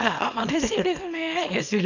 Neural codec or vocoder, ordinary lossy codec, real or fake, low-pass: codec, 24 kHz, 0.9 kbps, WavTokenizer, small release; none; fake; 7.2 kHz